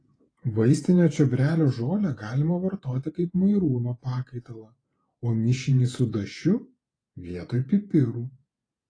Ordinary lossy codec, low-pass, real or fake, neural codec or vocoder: AAC, 32 kbps; 9.9 kHz; fake; vocoder, 48 kHz, 128 mel bands, Vocos